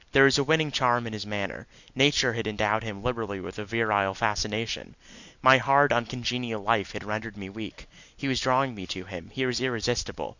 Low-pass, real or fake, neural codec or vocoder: 7.2 kHz; real; none